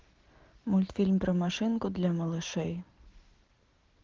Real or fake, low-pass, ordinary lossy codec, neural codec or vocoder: real; 7.2 kHz; Opus, 16 kbps; none